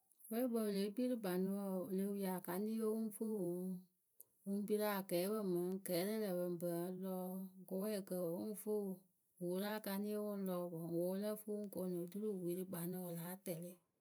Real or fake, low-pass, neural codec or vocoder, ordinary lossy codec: fake; none; vocoder, 44.1 kHz, 128 mel bands every 512 samples, BigVGAN v2; none